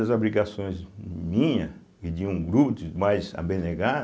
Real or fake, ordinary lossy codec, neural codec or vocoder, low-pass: real; none; none; none